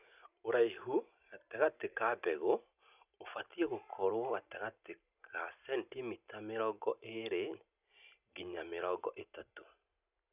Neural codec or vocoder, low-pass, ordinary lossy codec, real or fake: none; 3.6 kHz; none; real